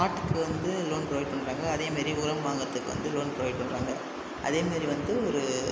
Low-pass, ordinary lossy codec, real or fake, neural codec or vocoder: none; none; real; none